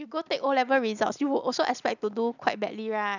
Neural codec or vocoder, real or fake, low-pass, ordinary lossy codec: none; real; 7.2 kHz; none